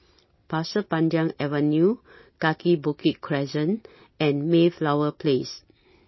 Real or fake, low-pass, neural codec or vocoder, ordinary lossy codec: real; 7.2 kHz; none; MP3, 24 kbps